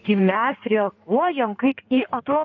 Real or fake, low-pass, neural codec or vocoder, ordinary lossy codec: fake; 7.2 kHz; codec, 16 kHz in and 24 kHz out, 1.1 kbps, FireRedTTS-2 codec; AAC, 48 kbps